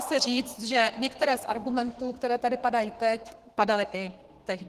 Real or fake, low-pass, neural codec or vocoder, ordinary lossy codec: fake; 14.4 kHz; codec, 32 kHz, 1.9 kbps, SNAC; Opus, 16 kbps